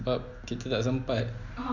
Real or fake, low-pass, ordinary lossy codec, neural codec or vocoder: real; 7.2 kHz; none; none